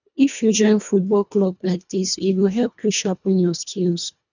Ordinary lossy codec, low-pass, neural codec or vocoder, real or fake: none; 7.2 kHz; codec, 24 kHz, 1.5 kbps, HILCodec; fake